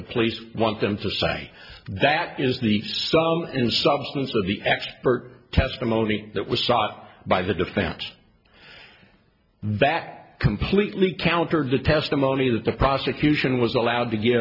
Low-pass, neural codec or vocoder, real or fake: 5.4 kHz; none; real